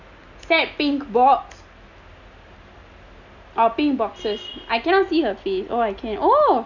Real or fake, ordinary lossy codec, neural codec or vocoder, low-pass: real; none; none; 7.2 kHz